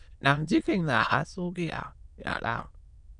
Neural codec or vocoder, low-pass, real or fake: autoencoder, 22.05 kHz, a latent of 192 numbers a frame, VITS, trained on many speakers; 9.9 kHz; fake